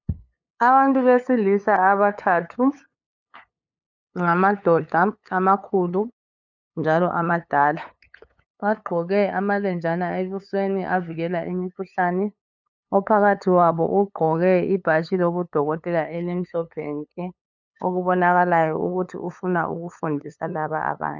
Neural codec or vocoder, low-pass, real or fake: codec, 16 kHz, 8 kbps, FunCodec, trained on LibriTTS, 25 frames a second; 7.2 kHz; fake